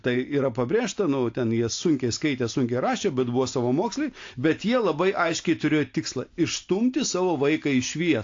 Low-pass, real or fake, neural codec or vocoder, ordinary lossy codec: 7.2 kHz; real; none; AAC, 48 kbps